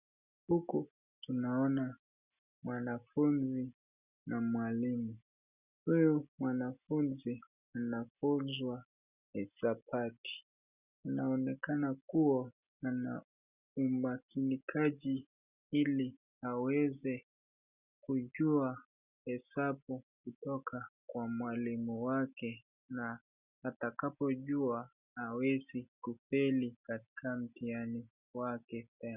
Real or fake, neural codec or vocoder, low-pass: real; none; 3.6 kHz